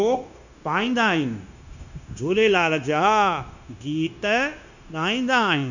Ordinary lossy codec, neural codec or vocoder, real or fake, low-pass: none; codec, 16 kHz, 0.9 kbps, LongCat-Audio-Codec; fake; 7.2 kHz